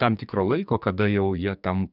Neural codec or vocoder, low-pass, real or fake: codec, 44.1 kHz, 2.6 kbps, SNAC; 5.4 kHz; fake